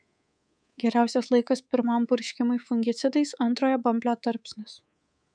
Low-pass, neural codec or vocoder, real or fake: 9.9 kHz; codec, 24 kHz, 3.1 kbps, DualCodec; fake